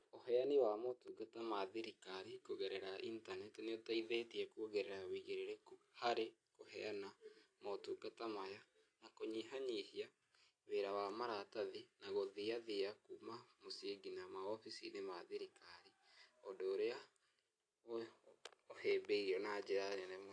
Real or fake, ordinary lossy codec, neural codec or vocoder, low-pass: real; AAC, 48 kbps; none; 9.9 kHz